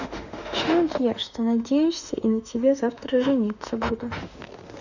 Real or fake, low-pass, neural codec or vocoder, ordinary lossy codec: fake; 7.2 kHz; autoencoder, 48 kHz, 32 numbers a frame, DAC-VAE, trained on Japanese speech; none